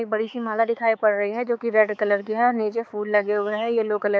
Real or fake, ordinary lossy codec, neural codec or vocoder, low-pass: fake; none; codec, 16 kHz, 4 kbps, X-Codec, HuBERT features, trained on balanced general audio; none